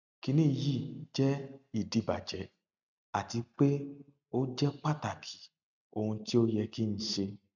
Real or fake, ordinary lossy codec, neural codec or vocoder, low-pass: real; none; none; 7.2 kHz